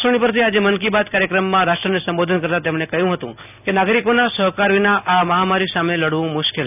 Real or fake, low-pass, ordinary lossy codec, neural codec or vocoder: real; 3.6 kHz; none; none